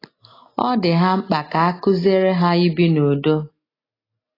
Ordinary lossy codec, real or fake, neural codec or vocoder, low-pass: AAC, 24 kbps; real; none; 5.4 kHz